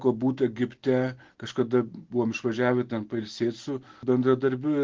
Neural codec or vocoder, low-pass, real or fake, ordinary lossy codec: none; 7.2 kHz; real; Opus, 16 kbps